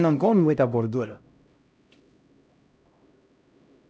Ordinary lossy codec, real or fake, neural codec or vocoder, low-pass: none; fake; codec, 16 kHz, 0.5 kbps, X-Codec, HuBERT features, trained on LibriSpeech; none